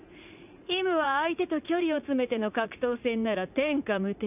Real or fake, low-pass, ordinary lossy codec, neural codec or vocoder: fake; 3.6 kHz; none; vocoder, 44.1 kHz, 80 mel bands, Vocos